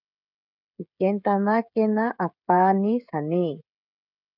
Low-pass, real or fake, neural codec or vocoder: 5.4 kHz; fake; codec, 16 kHz, 16 kbps, FreqCodec, smaller model